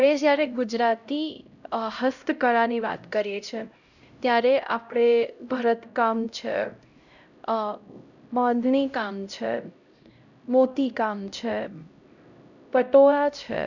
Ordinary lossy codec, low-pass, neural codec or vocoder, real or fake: none; 7.2 kHz; codec, 16 kHz, 0.5 kbps, X-Codec, HuBERT features, trained on LibriSpeech; fake